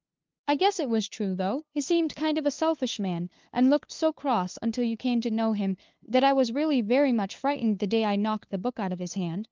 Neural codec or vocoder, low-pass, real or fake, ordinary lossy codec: codec, 16 kHz, 2 kbps, FunCodec, trained on LibriTTS, 25 frames a second; 7.2 kHz; fake; Opus, 32 kbps